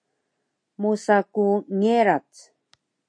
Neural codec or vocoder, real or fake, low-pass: none; real; 9.9 kHz